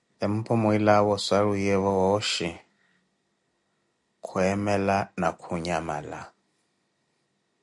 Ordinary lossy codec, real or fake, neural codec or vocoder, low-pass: MP3, 96 kbps; real; none; 10.8 kHz